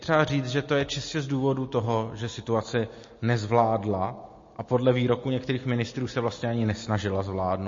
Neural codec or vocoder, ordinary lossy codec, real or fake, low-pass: none; MP3, 32 kbps; real; 7.2 kHz